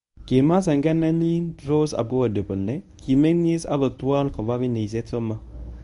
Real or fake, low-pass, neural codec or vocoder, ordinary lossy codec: fake; 10.8 kHz; codec, 24 kHz, 0.9 kbps, WavTokenizer, medium speech release version 1; none